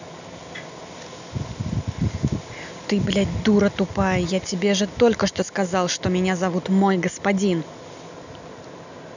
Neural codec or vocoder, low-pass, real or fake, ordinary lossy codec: none; 7.2 kHz; real; none